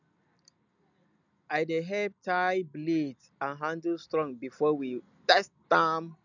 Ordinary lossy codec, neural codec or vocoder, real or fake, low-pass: none; none; real; 7.2 kHz